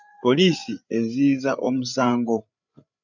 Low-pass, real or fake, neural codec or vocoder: 7.2 kHz; fake; codec, 16 kHz, 8 kbps, FreqCodec, larger model